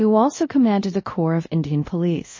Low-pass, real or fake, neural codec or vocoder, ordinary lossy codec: 7.2 kHz; fake; codec, 24 kHz, 0.5 kbps, DualCodec; MP3, 32 kbps